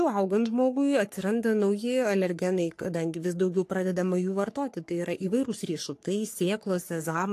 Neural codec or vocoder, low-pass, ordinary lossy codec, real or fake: codec, 44.1 kHz, 3.4 kbps, Pupu-Codec; 14.4 kHz; AAC, 64 kbps; fake